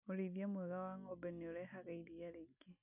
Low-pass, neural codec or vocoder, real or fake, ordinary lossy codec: 3.6 kHz; none; real; none